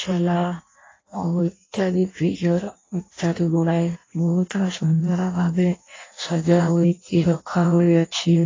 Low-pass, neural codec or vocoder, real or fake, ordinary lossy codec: 7.2 kHz; codec, 16 kHz in and 24 kHz out, 0.6 kbps, FireRedTTS-2 codec; fake; AAC, 32 kbps